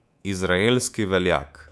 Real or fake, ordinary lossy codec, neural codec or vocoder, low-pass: fake; none; codec, 24 kHz, 3.1 kbps, DualCodec; none